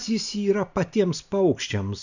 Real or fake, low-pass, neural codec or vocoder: real; 7.2 kHz; none